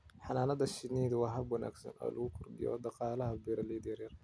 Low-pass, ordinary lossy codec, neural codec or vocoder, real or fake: none; none; none; real